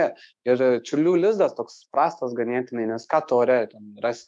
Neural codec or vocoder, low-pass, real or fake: autoencoder, 48 kHz, 128 numbers a frame, DAC-VAE, trained on Japanese speech; 10.8 kHz; fake